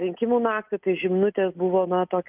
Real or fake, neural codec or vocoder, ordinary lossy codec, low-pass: real; none; Opus, 24 kbps; 3.6 kHz